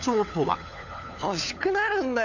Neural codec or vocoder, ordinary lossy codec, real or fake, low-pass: codec, 16 kHz, 4 kbps, FunCodec, trained on LibriTTS, 50 frames a second; none; fake; 7.2 kHz